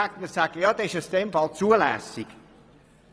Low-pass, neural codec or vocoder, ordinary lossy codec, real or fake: none; vocoder, 22.05 kHz, 80 mel bands, WaveNeXt; none; fake